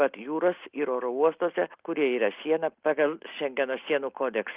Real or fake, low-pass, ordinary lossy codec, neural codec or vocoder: real; 3.6 kHz; Opus, 32 kbps; none